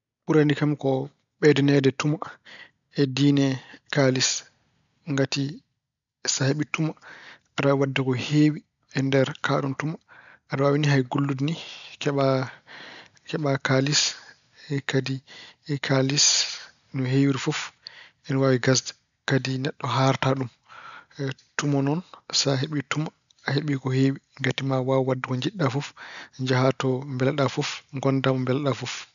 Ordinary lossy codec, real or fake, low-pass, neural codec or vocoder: none; real; 7.2 kHz; none